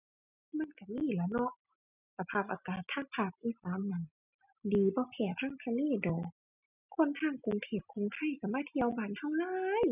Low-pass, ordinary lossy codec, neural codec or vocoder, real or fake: 3.6 kHz; none; none; real